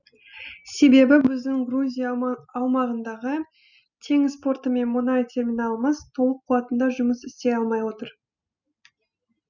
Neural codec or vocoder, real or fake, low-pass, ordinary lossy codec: none; real; 7.2 kHz; none